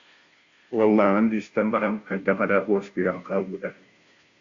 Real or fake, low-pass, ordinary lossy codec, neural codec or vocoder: fake; 7.2 kHz; Opus, 64 kbps; codec, 16 kHz, 0.5 kbps, FunCodec, trained on Chinese and English, 25 frames a second